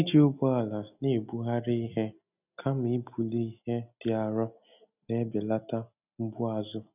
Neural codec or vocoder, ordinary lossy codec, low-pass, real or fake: none; none; 3.6 kHz; real